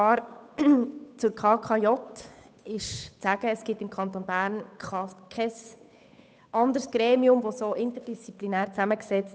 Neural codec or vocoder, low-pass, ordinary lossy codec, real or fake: codec, 16 kHz, 8 kbps, FunCodec, trained on Chinese and English, 25 frames a second; none; none; fake